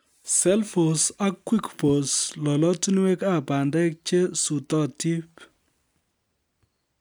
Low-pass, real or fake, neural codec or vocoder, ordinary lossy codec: none; real; none; none